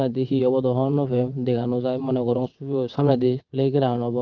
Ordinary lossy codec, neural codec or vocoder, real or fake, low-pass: Opus, 32 kbps; vocoder, 22.05 kHz, 80 mel bands, WaveNeXt; fake; 7.2 kHz